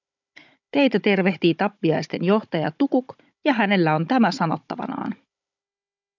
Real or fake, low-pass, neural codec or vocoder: fake; 7.2 kHz; codec, 16 kHz, 16 kbps, FunCodec, trained on Chinese and English, 50 frames a second